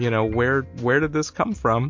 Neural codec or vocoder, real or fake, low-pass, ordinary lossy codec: none; real; 7.2 kHz; MP3, 48 kbps